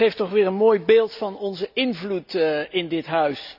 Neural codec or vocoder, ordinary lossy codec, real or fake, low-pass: none; none; real; 5.4 kHz